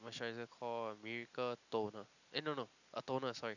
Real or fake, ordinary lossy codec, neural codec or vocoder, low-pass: real; none; none; 7.2 kHz